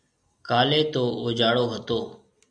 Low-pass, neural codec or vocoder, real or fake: 9.9 kHz; none; real